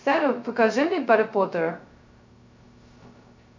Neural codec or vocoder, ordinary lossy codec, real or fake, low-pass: codec, 16 kHz, 0.2 kbps, FocalCodec; MP3, 48 kbps; fake; 7.2 kHz